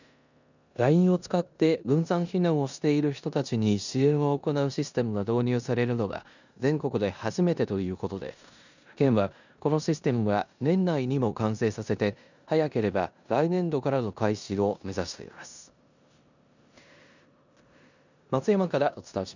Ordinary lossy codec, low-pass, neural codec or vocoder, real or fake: none; 7.2 kHz; codec, 16 kHz in and 24 kHz out, 0.9 kbps, LongCat-Audio-Codec, four codebook decoder; fake